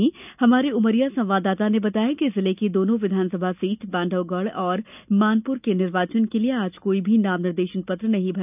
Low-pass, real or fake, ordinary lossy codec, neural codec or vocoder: 3.6 kHz; real; none; none